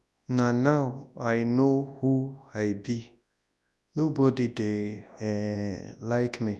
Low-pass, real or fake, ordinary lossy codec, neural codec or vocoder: none; fake; none; codec, 24 kHz, 0.9 kbps, WavTokenizer, large speech release